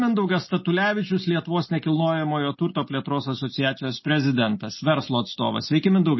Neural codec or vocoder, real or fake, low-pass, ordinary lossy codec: none; real; 7.2 kHz; MP3, 24 kbps